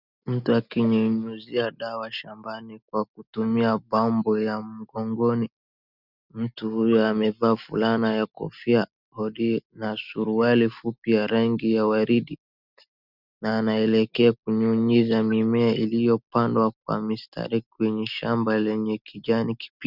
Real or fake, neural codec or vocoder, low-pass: real; none; 5.4 kHz